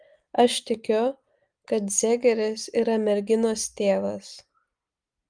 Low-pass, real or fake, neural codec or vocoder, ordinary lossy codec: 9.9 kHz; real; none; Opus, 32 kbps